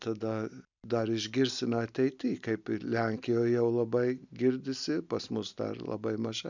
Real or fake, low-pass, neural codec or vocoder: real; 7.2 kHz; none